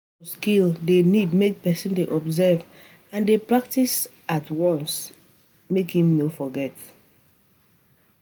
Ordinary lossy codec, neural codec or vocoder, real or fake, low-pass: none; none; real; none